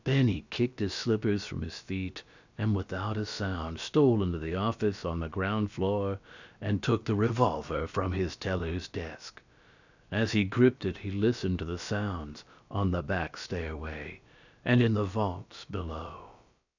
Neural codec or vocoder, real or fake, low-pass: codec, 16 kHz, about 1 kbps, DyCAST, with the encoder's durations; fake; 7.2 kHz